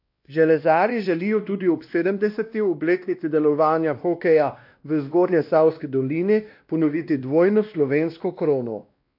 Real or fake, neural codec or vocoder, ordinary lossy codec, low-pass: fake; codec, 16 kHz, 1 kbps, X-Codec, WavLM features, trained on Multilingual LibriSpeech; AAC, 48 kbps; 5.4 kHz